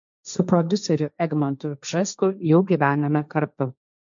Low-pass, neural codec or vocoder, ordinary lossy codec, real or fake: 7.2 kHz; codec, 16 kHz, 1.1 kbps, Voila-Tokenizer; MP3, 64 kbps; fake